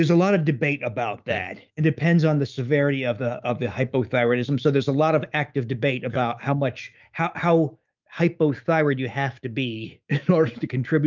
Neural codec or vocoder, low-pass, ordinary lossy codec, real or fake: autoencoder, 48 kHz, 128 numbers a frame, DAC-VAE, trained on Japanese speech; 7.2 kHz; Opus, 24 kbps; fake